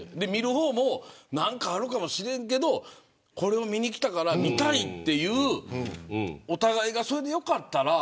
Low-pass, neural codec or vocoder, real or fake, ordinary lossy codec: none; none; real; none